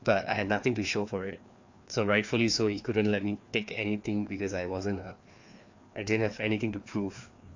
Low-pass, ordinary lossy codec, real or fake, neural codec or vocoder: 7.2 kHz; AAC, 48 kbps; fake; codec, 16 kHz, 2 kbps, FreqCodec, larger model